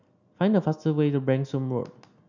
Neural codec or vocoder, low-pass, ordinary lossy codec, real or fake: none; 7.2 kHz; none; real